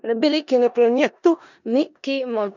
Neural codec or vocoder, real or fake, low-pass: codec, 16 kHz in and 24 kHz out, 0.4 kbps, LongCat-Audio-Codec, four codebook decoder; fake; 7.2 kHz